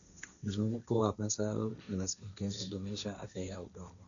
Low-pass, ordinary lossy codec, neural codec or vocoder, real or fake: 7.2 kHz; none; codec, 16 kHz, 1.1 kbps, Voila-Tokenizer; fake